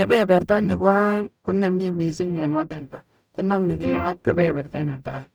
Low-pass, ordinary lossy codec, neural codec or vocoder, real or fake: none; none; codec, 44.1 kHz, 0.9 kbps, DAC; fake